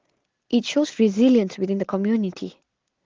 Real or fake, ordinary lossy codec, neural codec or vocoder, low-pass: real; Opus, 16 kbps; none; 7.2 kHz